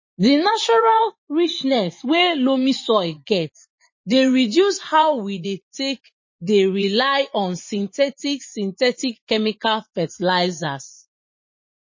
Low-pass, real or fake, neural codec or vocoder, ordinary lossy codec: 7.2 kHz; fake; vocoder, 24 kHz, 100 mel bands, Vocos; MP3, 32 kbps